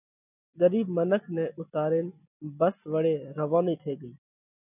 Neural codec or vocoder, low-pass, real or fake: none; 3.6 kHz; real